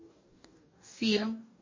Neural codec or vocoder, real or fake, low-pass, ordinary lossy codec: codec, 44.1 kHz, 2.6 kbps, DAC; fake; 7.2 kHz; MP3, 32 kbps